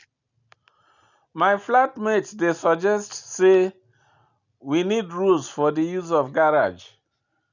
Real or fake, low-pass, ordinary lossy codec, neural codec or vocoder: real; 7.2 kHz; none; none